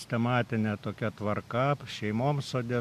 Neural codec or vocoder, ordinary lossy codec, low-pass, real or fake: none; MP3, 96 kbps; 14.4 kHz; real